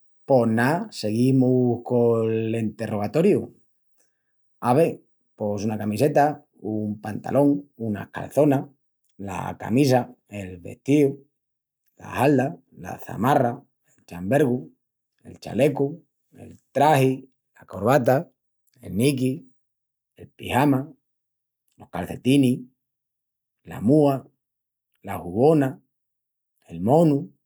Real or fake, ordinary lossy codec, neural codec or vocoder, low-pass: real; none; none; none